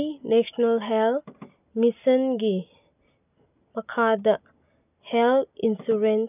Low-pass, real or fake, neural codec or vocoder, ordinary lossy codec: 3.6 kHz; real; none; none